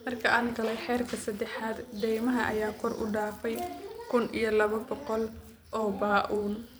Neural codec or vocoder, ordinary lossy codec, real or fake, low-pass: vocoder, 44.1 kHz, 128 mel bands every 512 samples, BigVGAN v2; none; fake; none